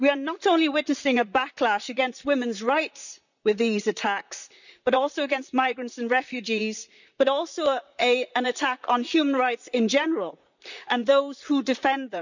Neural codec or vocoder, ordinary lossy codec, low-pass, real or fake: vocoder, 44.1 kHz, 128 mel bands, Pupu-Vocoder; none; 7.2 kHz; fake